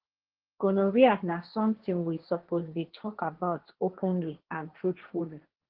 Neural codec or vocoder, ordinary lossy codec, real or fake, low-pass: codec, 16 kHz, 1.1 kbps, Voila-Tokenizer; Opus, 16 kbps; fake; 5.4 kHz